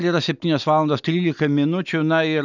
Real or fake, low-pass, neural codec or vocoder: real; 7.2 kHz; none